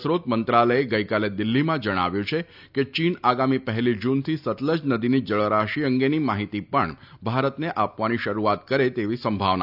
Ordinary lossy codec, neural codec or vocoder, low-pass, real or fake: none; none; 5.4 kHz; real